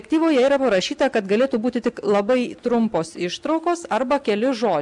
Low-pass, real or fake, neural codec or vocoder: 10.8 kHz; real; none